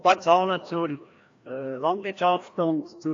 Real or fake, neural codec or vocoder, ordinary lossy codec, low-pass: fake; codec, 16 kHz, 1 kbps, FreqCodec, larger model; AAC, 48 kbps; 7.2 kHz